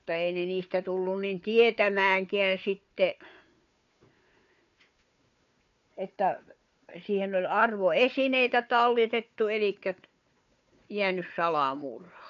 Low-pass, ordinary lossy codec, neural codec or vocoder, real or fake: 7.2 kHz; none; codec, 16 kHz, 4 kbps, FunCodec, trained on Chinese and English, 50 frames a second; fake